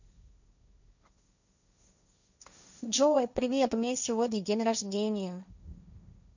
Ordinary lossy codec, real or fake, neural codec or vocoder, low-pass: none; fake; codec, 16 kHz, 1.1 kbps, Voila-Tokenizer; 7.2 kHz